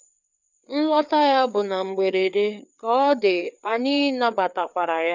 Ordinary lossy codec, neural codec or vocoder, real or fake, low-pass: none; codec, 16 kHz, 4 kbps, FreqCodec, larger model; fake; 7.2 kHz